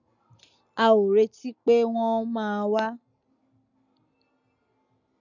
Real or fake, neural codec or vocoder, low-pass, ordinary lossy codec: real; none; 7.2 kHz; none